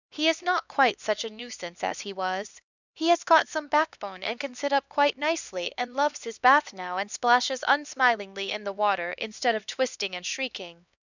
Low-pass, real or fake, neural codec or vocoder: 7.2 kHz; fake; codec, 16 kHz, 2 kbps, X-Codec, HuBERT features, trained on LibriSpeech